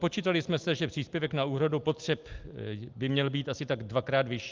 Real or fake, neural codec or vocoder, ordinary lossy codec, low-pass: real; none; Opus, 24 kbps; 7.2 kHz